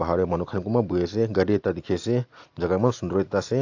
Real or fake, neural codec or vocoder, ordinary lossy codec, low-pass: real; none; AAC, 48 kbps; 7.2 kHz